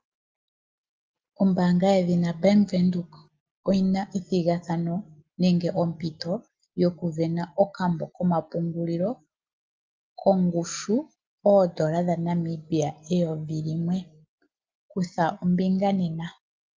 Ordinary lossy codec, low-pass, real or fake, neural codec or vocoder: Opus, 24 kbps; 7.2 kHz; real; none